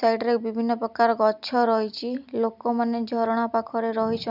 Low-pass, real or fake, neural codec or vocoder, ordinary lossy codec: 5.4 kHz; real; none; none